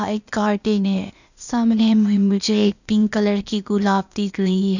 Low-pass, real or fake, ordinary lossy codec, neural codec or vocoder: 7.2 kHz; fake; none; codec, 16 kHz, 0.8 kbps, ZipCodec